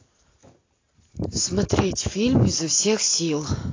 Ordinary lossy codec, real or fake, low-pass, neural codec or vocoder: AAC, 32 kbps; real; 7.2 kHz; none